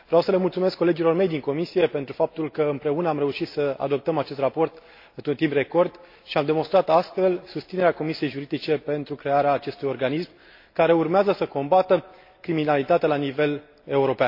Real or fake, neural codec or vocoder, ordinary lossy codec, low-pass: real; none; MP3, 32 kbps; 5.4 kHz